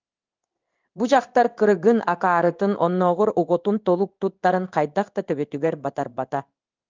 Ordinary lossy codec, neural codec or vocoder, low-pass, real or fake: Opus, 24 kbps; codec, 16 kHz in and 24 kHz out, 1 kbps, XY-Tokenizer; 7.2 kHz; fake